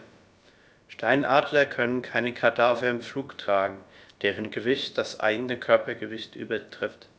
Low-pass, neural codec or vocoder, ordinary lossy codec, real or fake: none; codec, 16 kHz, about 1 kbps, DyCAST, with the encoder's durations; none; fake